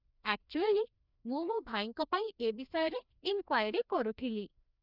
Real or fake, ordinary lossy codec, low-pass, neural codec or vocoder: fake; none; 5.4 kHz; codec, 16 kHz, 1 kbps, FreqCodec, larger model